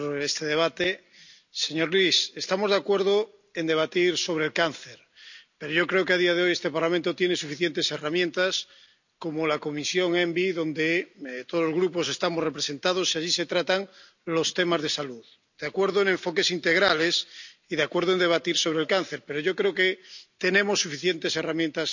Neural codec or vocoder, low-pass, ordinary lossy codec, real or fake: none; 7.2 kHz; none; real